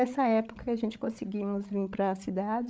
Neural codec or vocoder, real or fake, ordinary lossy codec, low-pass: codec, 16 kHz, 8 kbps, FreqCodec, larger model; fake; none; none